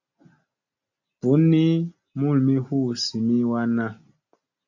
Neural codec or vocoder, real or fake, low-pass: none; real; 7.2 kHz